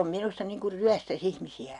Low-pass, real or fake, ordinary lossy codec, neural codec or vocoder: 10.8 kHz; real; none; none